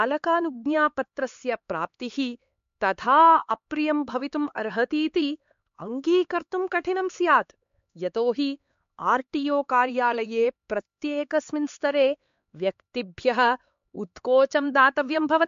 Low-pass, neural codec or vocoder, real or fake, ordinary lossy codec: 7.2 kHz; codec, 16 kHz, 4 kbps, X-Codec, HuBERT features, trained on LibriSpeech; fake; MP3, 48 kbps